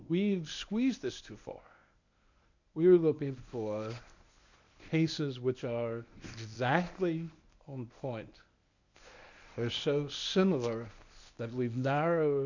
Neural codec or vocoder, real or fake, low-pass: codec, 24 kHz, 0.9 kbps, WavTokenizer, medium speech release version 1; fake; 7.2 kHz